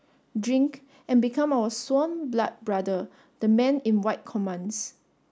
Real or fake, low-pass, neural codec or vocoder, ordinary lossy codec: real; none; none; none